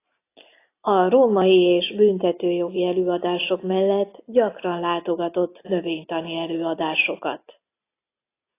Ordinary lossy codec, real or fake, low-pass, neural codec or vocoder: AAC, 24 kbps; real; 3.6 kHz; none